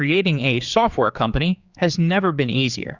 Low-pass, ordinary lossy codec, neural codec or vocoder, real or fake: 7.2 kHz; Opus, 64 kbps; codec, 16 kHz, 4 kbps, X-Codec, HuBERT features, trained on general audio; fake